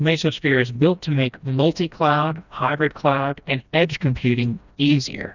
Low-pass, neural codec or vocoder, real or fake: 7.2 kHz; codec, 16 kHz, 1 kbps, FreqCodec, smaller model; fake